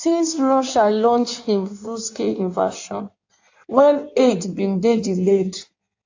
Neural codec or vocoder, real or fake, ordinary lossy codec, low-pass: codec, 16 kHz in and 24 kHz out, 1.1 kbps, FireRedTTS-2 codec; fake; AAC, 48 kbps; 7.2 kHz